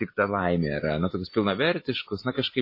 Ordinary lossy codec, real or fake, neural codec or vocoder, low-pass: MP3, 24 kbps; real; none; 5.4 kHz